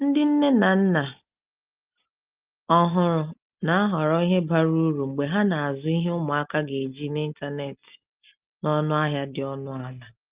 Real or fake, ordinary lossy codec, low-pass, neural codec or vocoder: real; Opus, 24 kbps; 3.6 kHz; none